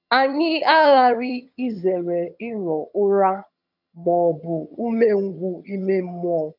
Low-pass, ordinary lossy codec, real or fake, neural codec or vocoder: 5.4 kHz; none; fake; vocoder, 22.05 kHz, 80 mel bands, HiFi-GAN